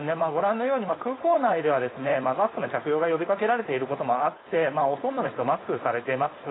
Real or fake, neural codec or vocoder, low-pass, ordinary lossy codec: fake; codec, 16 kHz, 4.8 kbps, FACodec; 7.2 kHz; AAC, 16 kbps